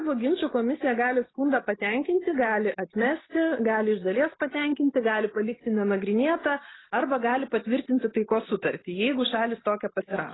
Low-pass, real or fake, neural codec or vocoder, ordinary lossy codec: 7.2 kHz; real; none; AAC, 16 kbps